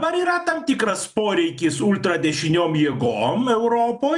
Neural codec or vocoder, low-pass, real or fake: vocoder, 44.1 kHz, 128 mel bands every 256 samples, BigVGAN v2; 10.8 kHz; fake